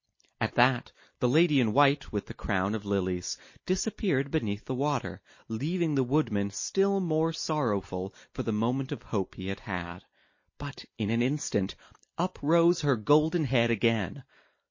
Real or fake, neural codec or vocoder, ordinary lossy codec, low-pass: real; none; MP3, 32 kbps; 7.2 kHz